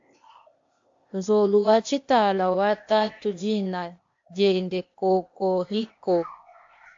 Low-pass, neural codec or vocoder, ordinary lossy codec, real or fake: 7.2 kHz; codec, 16 kHz, 0.8 kbps, ZipCodec; AAC, 48 kbps; fake